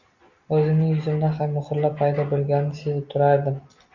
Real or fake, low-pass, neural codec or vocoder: real; 7.2 kHz; none